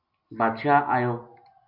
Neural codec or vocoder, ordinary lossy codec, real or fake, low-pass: none; MP3, 48 kbps; real; 5.4 kHz